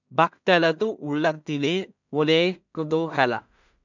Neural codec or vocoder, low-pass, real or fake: codec, 16 kHz in and 24 kHz out, 0.4 kbps, LongCat-Audio-Codec, two codebook decoder; 7.2 kHz; fake